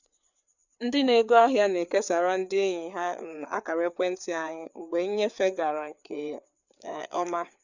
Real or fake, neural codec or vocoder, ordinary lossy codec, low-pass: fake; codec, 16 kHz, 4 kbps, FreqCodec, larger model; none; 7.2 kHz